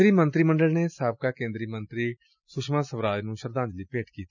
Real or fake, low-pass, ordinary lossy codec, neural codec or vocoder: real; 7.2 kHz; none; none